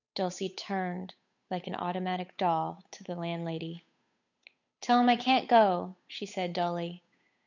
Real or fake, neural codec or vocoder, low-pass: fake; codec, 16 kHz, 8 kbps, FunCodec, trained on Chinese and English, 25 frames a second; 7.2 kHz